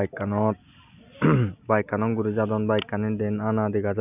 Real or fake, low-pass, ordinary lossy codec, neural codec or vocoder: real; 3.6 kHz; none; none